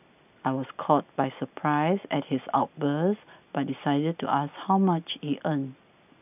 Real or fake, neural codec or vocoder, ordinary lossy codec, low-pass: real; none; none; 3.6 kHz